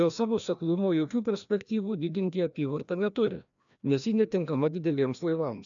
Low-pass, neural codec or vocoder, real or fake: 7.2 kHz; codec, 16 kHz, 1 kbps, FreqCodec, larger model; fake